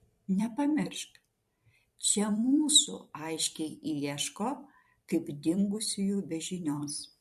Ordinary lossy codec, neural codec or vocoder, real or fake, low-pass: MP3, 64 kbps; vocoder, 44.1 kHz, 128 mel bands every 256 samples, BigVGAN v2; fake; 14.4 kHz